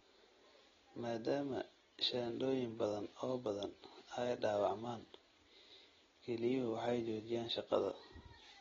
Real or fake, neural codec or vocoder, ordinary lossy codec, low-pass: real; none; AAC, 24 kbps; 7.2 kHz